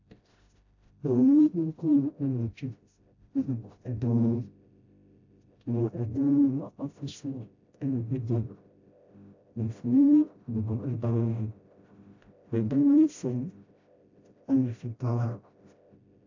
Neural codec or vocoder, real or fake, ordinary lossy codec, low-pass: codec, 16 kHz, 0.5 kbps, FreqCodec, smaller model; fake; AAC, 48 kbps; 7.2 kHz